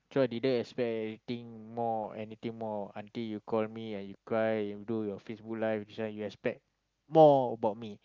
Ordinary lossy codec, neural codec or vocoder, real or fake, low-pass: Opus, 32 kbps; none; real; 7.2 kHz